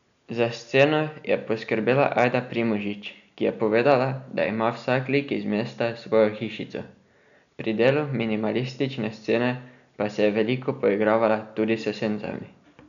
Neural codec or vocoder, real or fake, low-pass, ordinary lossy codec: none; real; 7.2 kHz; none